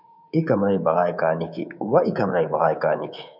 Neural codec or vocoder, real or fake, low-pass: codec, 24 kHz, 3.1 kbps, DualCodec; fake; 5.4 kHz